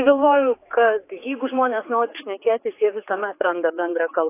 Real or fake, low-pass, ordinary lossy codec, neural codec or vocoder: fake; 3.6 kHz; AAC, 24 kbps; codec, 16 kHz, 4 kbps, X-Codec, HuBERT features, trained on general audio